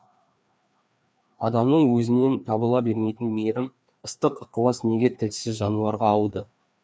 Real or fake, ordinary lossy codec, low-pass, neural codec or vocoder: fake; none; none; codec, 16 kHz, 2 kbps, FreqCodec, larger model